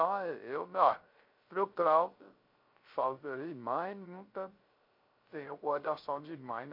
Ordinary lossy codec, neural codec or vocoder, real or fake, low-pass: MP3, 32 kbps; codec, 16 kHz, 0.3 kbps, FocalCodec; fake; 5.4 kHz